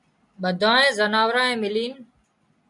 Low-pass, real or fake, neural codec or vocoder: 10.8 kHz; real; none